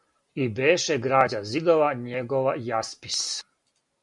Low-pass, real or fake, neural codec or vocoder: 10.8 kHz; real; none